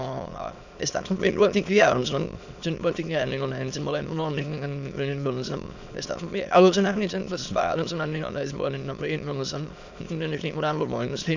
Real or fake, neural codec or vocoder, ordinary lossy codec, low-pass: fake; autoencoder, 22.05 kHz, a latent of 192 numbers a frame, VITS, trained on many speakers; none; 7.2 kHz